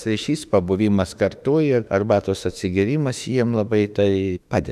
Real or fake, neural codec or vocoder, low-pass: fake; autoencoder, 48 kHz, 32 numbers a frame, DAC-VAE, trained on Japanese speech; 14.4 kHz